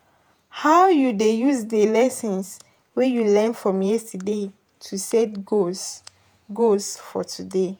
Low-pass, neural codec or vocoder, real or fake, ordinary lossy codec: none; vocoder, 48 kHz, 128 mel bands, Vocos; fake; none